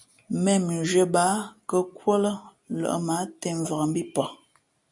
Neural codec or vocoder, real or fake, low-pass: none; real; 10.8 kHz